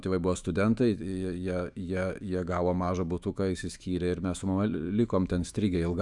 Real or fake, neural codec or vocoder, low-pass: fake; autoencoder, 48 kHz, 128 numbers a frame, DAC-VAE, trained on Japanese speech; 10.8 kHz